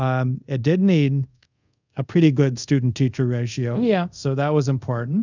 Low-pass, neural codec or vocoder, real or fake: 7.2 kHz; codec, 24 kHz, 0.5 kbps, DualCodec; fake